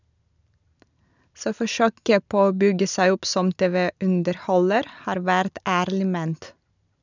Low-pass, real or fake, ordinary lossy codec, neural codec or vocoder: 7.2 kHz; real; none; none